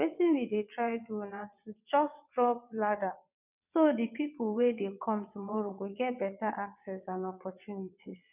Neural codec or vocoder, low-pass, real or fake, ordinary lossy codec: vocoder, 22.05 kHz, 80 mel bands, WaveNeXt; 3.6 kHz; fake; none